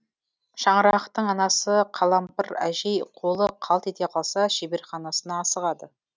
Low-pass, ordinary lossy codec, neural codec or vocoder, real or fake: none; none; none; real